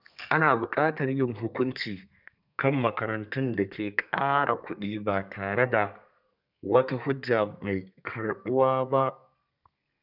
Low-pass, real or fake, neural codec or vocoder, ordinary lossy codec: 5.4 kHz; fake; codec, 32 kHz, 1.9 kbps, SNAC; none